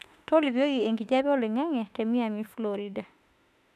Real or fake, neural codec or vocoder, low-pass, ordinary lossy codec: fake; autoencoder, 48 kHz, 32 numbers a frame, DAC-VAE, trained on Japanese speech; 14.4 kHz; none